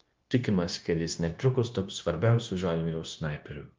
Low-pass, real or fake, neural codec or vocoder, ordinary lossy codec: 7.2 kHz; fake; codec, 16 kHz, 0.9 kbps, LongCat-Audio-Codec; Opus, 32 kbps